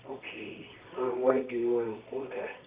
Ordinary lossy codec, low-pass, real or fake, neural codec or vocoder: Opus, 64 kbps; 3.6 kHz; fake; codec, 24 kHz, 0.9 kbps, WavTokenizer, medium music audio release